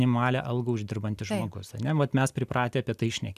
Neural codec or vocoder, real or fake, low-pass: none; real; 14.4 kHz